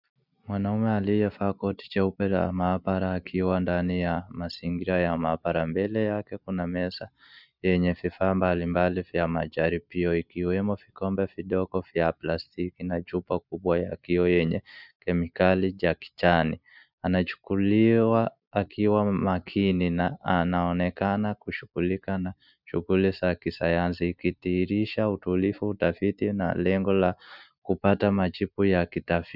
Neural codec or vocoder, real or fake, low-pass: none; real; 5.4 kHz